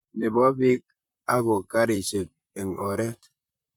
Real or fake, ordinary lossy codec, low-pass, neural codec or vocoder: fake; none; 19.8 kHz; vocoder, 44.1 kHz, 128 mel bands, Pupu-Vocoder